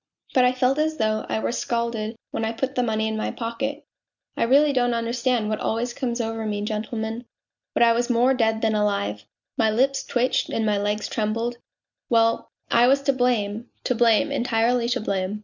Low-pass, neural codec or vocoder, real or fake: 7.2 kHz; none; real